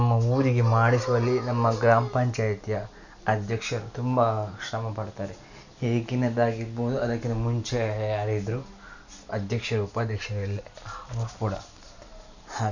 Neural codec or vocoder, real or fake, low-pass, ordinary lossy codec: none; real; 7.2 kHz; none